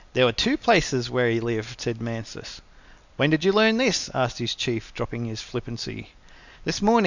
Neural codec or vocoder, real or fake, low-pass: none; real; 7.2 kHz